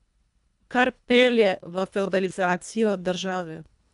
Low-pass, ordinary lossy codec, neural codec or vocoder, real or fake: 10.8 kHz; MP3, 96 kbps; codec, 24 kHz, 1.5 kbps, HILCodec; fake